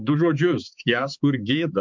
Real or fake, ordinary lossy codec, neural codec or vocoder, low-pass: fake; MP3, 64 kbps; codec, 16 kHz, 4 kbps, X-Codec, HuBERT features, trained on balanced general audio; 7.2 kHz